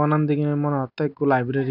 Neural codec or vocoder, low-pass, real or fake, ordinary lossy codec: none; 5.4 kHz; real; none